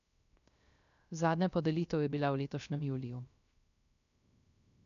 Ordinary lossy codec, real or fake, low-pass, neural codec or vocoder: none; fake; 7.2 kHz; codec, 16 kHz, 0.3 kbps, FocalCodec